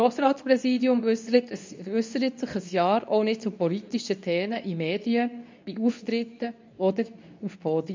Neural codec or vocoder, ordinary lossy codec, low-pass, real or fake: codec, 24 kHz, 0.9 kbps, WavTokenizer, medium speech release version 2; MP3, 64 kbps; 7.2 kHz; fake